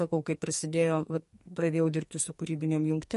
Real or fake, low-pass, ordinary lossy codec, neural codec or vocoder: fake; 14.4 kHz; MP3, 48 kbps; codec, 44.1 kHz, 2.6 kbps, SNAC